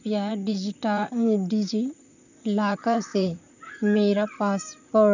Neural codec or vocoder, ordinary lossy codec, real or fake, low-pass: vocoder, 44.1 kHz, 128 mel bands, Pupu-Vocoder; none; fake; 7.2 kHz